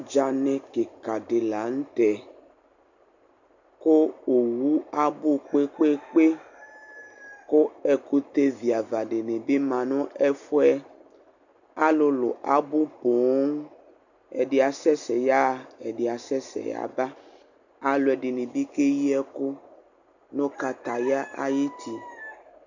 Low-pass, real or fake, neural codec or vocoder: 7.2 kHz; real; none